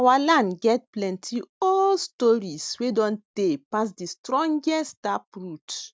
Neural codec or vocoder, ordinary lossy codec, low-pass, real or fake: none; none; none; real